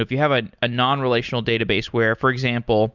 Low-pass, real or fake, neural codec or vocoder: 7.2 kHz; real; none